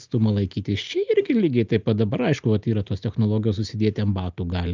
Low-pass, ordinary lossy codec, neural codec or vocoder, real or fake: 7.2 kHz; Opus, 24 kbps; none; real